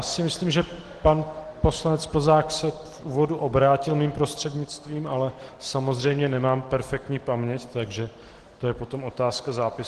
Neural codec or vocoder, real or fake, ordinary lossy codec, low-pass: none; real; Opus, 16 kbps; 10.8 kHz